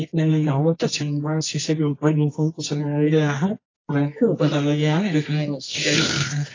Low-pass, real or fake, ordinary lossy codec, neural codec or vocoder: 7.2 kHz; fake; AAC, 32 kbps; codec, 24 kHz, 0.9 kbps, WavTokenizer, medium music audio release